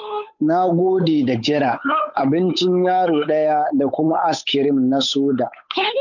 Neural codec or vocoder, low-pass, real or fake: codec, 16 kHz, 8 kbps, FunCodec, trained on Chinese and English, 25 frames a second; 7.2 kHz; fake